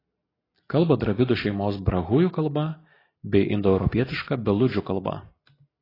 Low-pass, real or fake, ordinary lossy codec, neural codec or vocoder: 5.4 kHz; real; AAC, 24 kbps; none